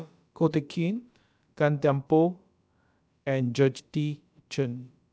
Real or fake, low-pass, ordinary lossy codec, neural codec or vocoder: fake; none; none; codec, 16 kHz, about 1 kbps, DyCAST, with the encoder's durations